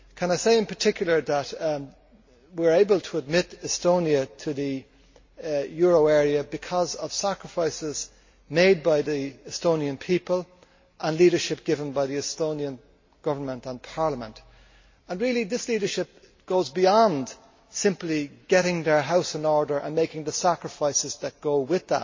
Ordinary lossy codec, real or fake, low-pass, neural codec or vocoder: MP3, 32 kbps; real; 7.2 kHz; none